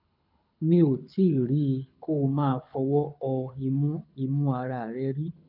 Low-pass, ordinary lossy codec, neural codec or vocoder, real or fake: 5.4 kHz; none; codec, 24 kHz, 6 kbps, HILCodec; fake